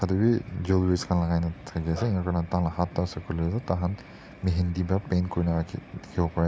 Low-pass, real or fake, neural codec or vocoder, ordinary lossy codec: none; real; none; none